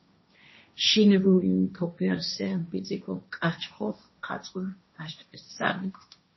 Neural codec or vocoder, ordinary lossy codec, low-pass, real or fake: codec, 16 kHz, 1.1 kbps, Voila-Tokenizer; MP3, 24 kbps; 7.2 kHz; fake